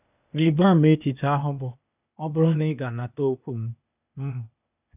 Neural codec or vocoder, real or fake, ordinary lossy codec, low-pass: codec, 16 kHz, 0.8 kbps, ZipCodec; fake; none; 3.6 kHz